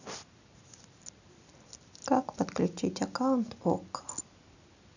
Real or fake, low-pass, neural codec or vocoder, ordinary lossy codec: real; 7.2 kHz; none; none